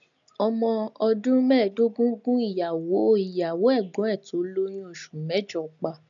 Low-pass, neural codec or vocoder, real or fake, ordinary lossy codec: 7.2 kHz; none; real; none